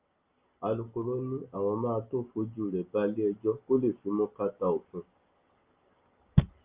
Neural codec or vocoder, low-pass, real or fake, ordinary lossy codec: none; 3.6 kHz; real; Opus, 32 kbps